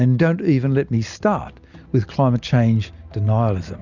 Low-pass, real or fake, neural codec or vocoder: 7.2 kHz; real; none